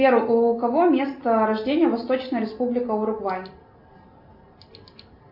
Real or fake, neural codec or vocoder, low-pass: real; none; 5.4 kHz